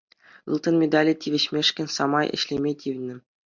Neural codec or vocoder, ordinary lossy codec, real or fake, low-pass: none; MP3, 64 kbps; real; 7.2 kHz